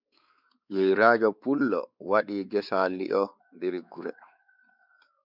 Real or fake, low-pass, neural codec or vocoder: fake; 5.4 kHz; codec, 16 kHz, 4 kbps, X-Codec, WavLM features, trained on Multilingual LibriSpeech